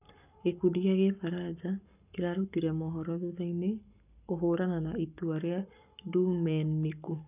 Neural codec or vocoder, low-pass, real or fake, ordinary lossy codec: codec, 44.1 kHz, 7.8 kbps, Pupu-Codec; 3.6 kHz; fake; none